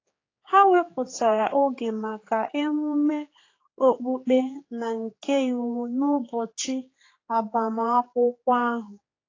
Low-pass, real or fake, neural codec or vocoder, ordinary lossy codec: 7.2 kHz; fake; codec, 16 kHz, 4 kbps, X-Codec, HuBERT features, trained on general audio; AAC, 32 kbps